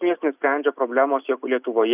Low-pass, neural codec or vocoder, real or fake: 3.6 kHz; none; real